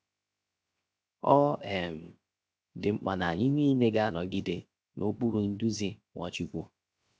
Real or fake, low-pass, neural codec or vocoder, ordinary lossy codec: fake; none; codec, 16 kHz, 0.7 kbps, FocalCodec; none